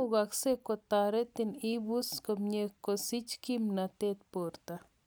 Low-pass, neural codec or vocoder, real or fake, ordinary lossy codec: none; none; real; none